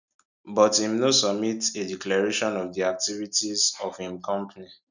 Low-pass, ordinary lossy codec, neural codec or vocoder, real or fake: 7.2 kHz; none; none; real